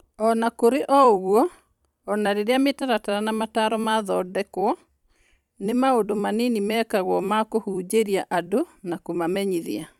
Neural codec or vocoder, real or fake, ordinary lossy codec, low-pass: vocoder, 44.1 kHz, 128 mel bands every 256 samples, BigVGAN v2; fake; none; 19.8 kHz